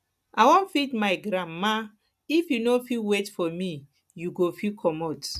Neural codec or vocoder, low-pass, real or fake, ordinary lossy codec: none; 14.4 kHz; real; none